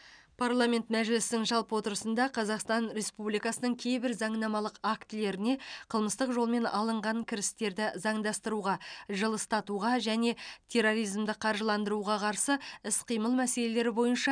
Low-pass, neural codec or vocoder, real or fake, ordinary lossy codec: 9.9 kHz; none; real; none